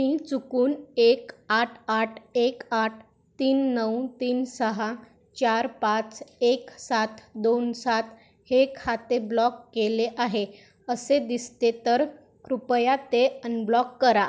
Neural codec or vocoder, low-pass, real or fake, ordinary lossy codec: none; none; real; none